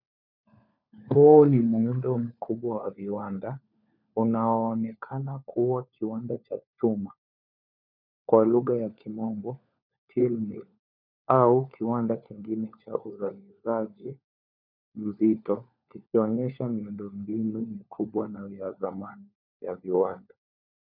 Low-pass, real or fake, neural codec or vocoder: 5.4 kHz; fake; codec, 16 kHz, 4 kbps, FunCodec, trained on LibriTTS, 50 frames a second